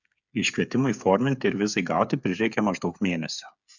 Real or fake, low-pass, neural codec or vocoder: fake; 7.2 kHz; codec, 16 kHz, 8 kbps, FreqCodec, smaller model